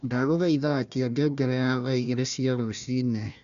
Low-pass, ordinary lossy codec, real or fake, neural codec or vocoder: 7.2 kHz; none; fake; codec, 16 kHz, 1 kbps, FunCodec, trained on Chinese and English, 50 frames a second